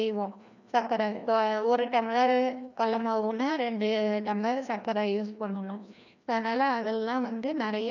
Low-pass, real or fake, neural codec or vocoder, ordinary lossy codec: 7.2 kHz; fake; codec, 16 kHz, 1 kbps, FreqCodec, larger model; none